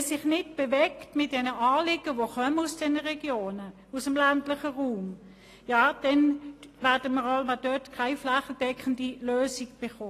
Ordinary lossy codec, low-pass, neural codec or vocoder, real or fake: AAC, 48 kbps; 14.4 kHz; none; real